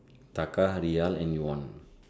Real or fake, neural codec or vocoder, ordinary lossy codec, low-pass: real; none; none; none